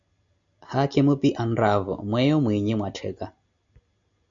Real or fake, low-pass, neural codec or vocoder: real; 7.2 kHz; none